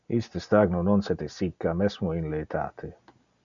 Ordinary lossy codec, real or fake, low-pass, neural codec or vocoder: MP3, 96 kbps; real; 7.2 kHz; none